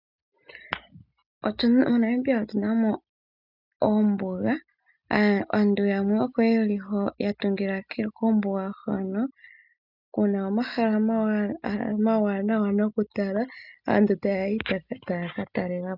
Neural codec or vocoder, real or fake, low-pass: none; real; 5.4 kHz